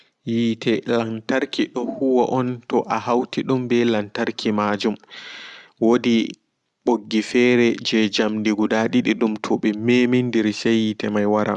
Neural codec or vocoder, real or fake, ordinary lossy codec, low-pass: none; real; Opus, 64 kbps; 10.8 kHz